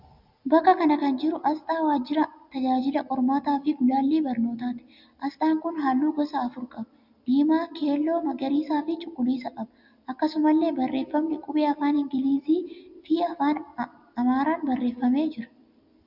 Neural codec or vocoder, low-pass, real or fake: none; 5.4 kHz; real